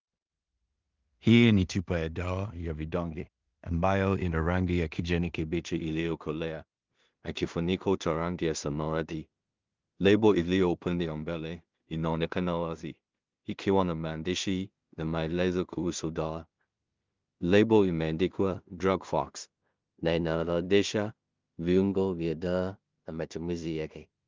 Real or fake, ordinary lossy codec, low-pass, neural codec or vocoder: fake; Opus, 32 kbps; 7.2 kHz; codec, 16 kHz in and 24 kHz out, 0.4 kbps, LongCat-Audio-Codec, two codebook decoder